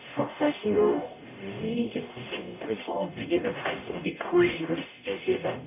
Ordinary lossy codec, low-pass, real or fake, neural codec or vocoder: none; 3.6 kHz; fake; codec, 44.1 kHz, 0.9 kbps, DAC